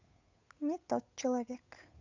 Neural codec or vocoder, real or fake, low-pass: codec, 16 kHz, 8 kbps, FunCodec, trained on Chinese and English, 25 frames a second; fake; 7.2 kHz